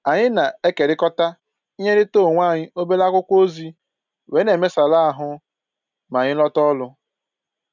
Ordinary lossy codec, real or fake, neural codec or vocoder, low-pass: none; real; none; 7.2 kHz